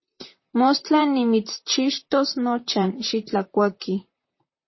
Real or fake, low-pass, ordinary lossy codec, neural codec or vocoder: fake; 7.2 kHz; MP3, 24 kbps; vocoder, 44.1 kHz, 128 mel bands, Pupu-Vocoder